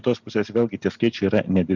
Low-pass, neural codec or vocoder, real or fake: 7.2 kHz; none; real